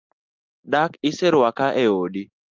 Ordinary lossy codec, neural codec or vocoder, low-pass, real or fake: Opus, 24 kbps; none; 7.2 kHz; real